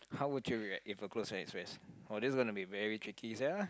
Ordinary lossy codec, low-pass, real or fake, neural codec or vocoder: none; none; real; none